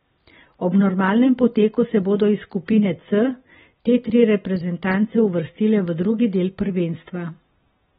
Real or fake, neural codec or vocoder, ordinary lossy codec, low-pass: real; none; AAC, 16 kbps; 19.8 kHz